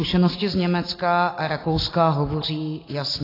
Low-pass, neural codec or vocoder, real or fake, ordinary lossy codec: 5.4 kHz; none; real; AAC, 24 kbps